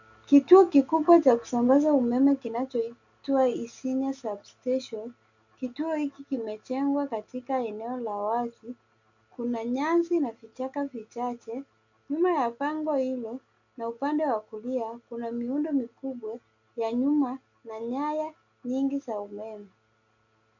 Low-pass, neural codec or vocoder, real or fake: 7.2 kHz; none; real